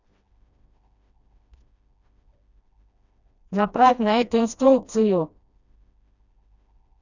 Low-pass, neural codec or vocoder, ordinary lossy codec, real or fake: 7.2 kHz; codec, 16 kHz, 1 kbps, FreqCodec, smaller model; none; fake